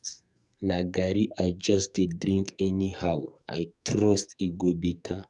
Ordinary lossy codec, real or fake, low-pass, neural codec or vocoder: none; fake; 10.8 kHz; codec, 44.1 kHz, 2.6 kbps, SNAC